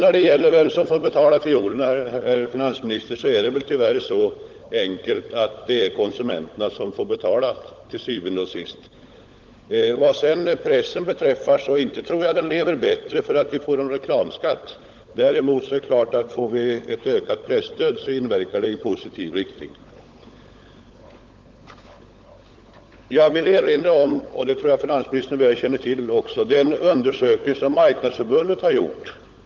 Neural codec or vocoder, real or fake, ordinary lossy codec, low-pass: codec, 16 kHz, 16 kbps, FunCodec, trained on LibriTTS, 50 frames a second; fake; Opus, 32 kbps; 7.2 kHz